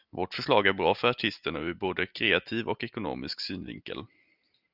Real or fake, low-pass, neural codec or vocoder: real; 5.4 kHz; none